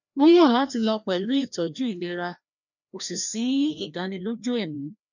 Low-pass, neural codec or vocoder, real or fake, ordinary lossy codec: 7.2 kHz; codec, 16 kHz, 1 kbps, FreqCodec, larger model; fake; none